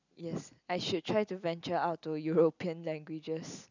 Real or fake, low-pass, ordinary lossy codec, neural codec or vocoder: real; 7.2 kHz; none; none